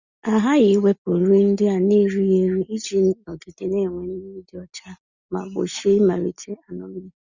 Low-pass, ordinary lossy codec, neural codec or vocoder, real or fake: 7.2 kHz; Opus, 64 kbps; none; real